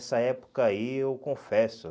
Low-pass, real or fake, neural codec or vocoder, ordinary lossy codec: none; real; none; none